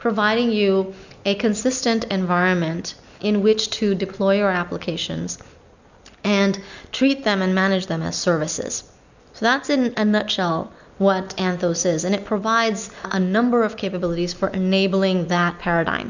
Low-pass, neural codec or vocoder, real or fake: 7.2 kHz; none; real